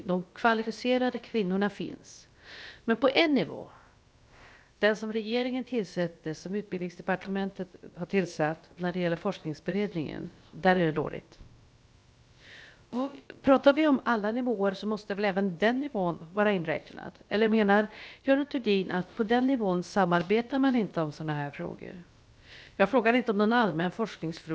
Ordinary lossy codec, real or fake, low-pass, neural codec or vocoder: none; fake; none; codec, 16 kHz, about 1 kbps, DyCAST, with the encoder's durations